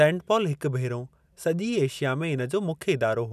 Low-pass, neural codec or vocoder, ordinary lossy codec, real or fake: 14.4 kHz; none; none; real